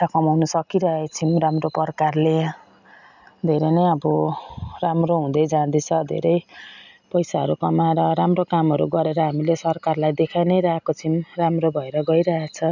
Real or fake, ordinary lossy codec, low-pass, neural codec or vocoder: real; none; 7.2 kHz; none